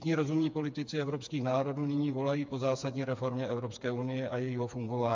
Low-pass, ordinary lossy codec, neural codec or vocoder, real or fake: 7.2 kHz; MP3, 64 kbps; codec, 16 kHz, 4 kbps, FreqCodec, smaller model; fake